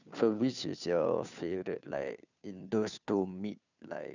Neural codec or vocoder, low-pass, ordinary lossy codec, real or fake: codec, 16 kHz, 2 kbps, FunCodec, trained on Chinese and English, 25 frames a second; 7.2 kHz; none; fake